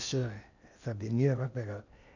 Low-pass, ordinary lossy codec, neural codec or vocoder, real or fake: 7.2 kHz; none; codec, 16 kHz in and 24 kHz out, 0.8 kbps, FocalCodec, streaming, 65536 codes; fake